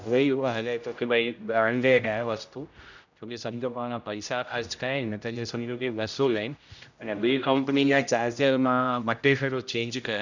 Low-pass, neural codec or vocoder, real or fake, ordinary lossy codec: 7.2 kHz; codec, 16 kHz, 0.5 kbps, X-Codec, HuBERT features, trained on general audio; fake; none